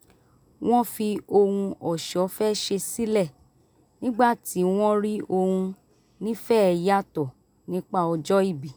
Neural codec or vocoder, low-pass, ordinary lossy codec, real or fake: none; none; none; real